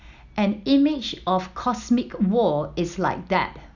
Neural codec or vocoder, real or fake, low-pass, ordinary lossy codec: none; real; 7.2 kHz; none